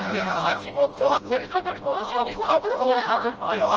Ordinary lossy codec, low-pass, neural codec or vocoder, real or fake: Opus, 24 kbps; 7.2 kHz; codec, 16 kHz, 0.5 kbps, FreqCodec, smaller model; fake